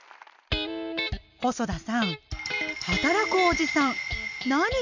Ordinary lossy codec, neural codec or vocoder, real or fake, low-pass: none; none; real; 7.2 kHz